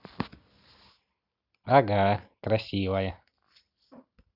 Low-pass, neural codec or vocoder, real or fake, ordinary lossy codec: 5.4 kHz; none; real; none